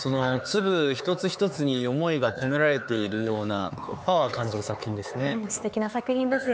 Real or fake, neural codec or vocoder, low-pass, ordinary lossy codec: fake; codec, 16 kHz, 4 kbps, X-Codec, HuBERT features, trained on LibriSpeech; none; none